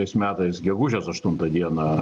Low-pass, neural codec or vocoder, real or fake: 10.8 kHz; none; real